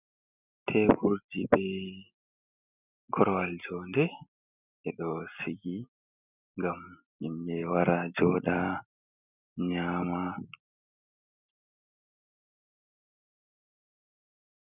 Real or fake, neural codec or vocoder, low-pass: real; none; 3.6 kHz